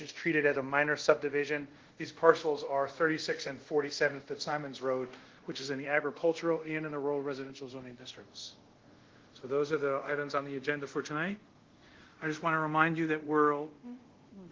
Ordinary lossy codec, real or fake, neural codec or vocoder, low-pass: Opus, 16 kbps; fake; codec, 24 kHz, 0.5 kbps, DualCodec; 7.2 kHz